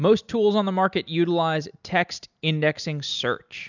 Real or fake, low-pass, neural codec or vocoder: real; 7.2 kHz; none